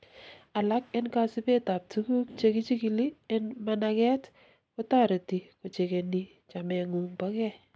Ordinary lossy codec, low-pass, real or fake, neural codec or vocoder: none; none; real; none